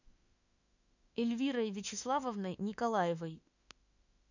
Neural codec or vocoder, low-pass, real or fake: autoencoder, 48 kHz, 32 numbers a frame, DAC-VAE, trained on Japanese speech; 7.2 kHz; fake